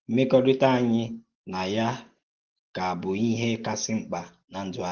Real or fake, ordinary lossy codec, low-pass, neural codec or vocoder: real; Opus, 16 kbps; 7.2 kHz; none